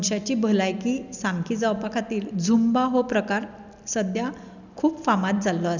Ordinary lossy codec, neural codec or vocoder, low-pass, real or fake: none; none; 7.2 kHz; real